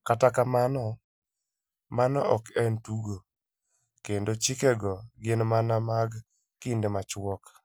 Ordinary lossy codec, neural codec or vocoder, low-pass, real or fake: none; none; none; real